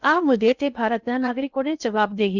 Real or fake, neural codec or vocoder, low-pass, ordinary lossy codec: fake; codec, 16 kHz in and 24 kHz out, 0.6 kbps, FocalCodec, streaming, 2048 codes; 7.2 kHz; none